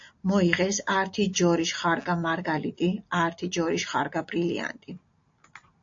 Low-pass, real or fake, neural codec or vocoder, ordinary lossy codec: 7.2 kHz; real; none; AAC, 48 kbps